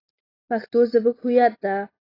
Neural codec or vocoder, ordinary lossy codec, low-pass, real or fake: none; AAC, 24 kbps; 5.4 kHz; real